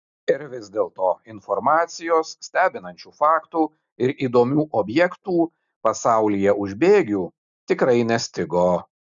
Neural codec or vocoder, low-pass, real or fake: none; 7.2 kHz; real